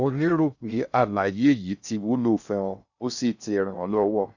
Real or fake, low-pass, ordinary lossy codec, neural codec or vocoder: fake; 7.2 kHz; none; codec, 16 kHz in and 24 kHz out, 0.6 kbps, FocalCodec, streaming, 2048 codes